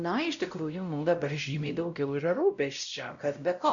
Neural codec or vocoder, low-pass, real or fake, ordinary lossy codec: codec, 16 kHz, 0.5 kbps, X-Codec, WavLM features, trained on Multilingual LibriSpeech; 7.2 kHz; fake; Opus, 64 kbps